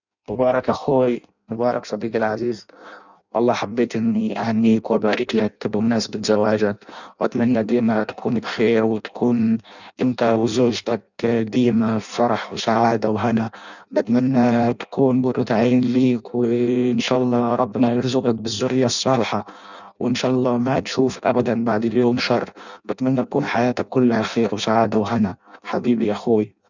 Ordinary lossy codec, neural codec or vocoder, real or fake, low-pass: none; codec, 16 kHz in and 24 kHz out, 0.6 kbps, FireRedTTS-2 codec; fake; 7.2 kHz